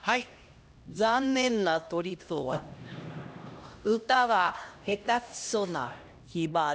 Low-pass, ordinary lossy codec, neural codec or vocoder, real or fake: none; none; codec, 16 kHz, 1 kbps, X-Codec, HuBERT features, trained on LibriSpeech; fake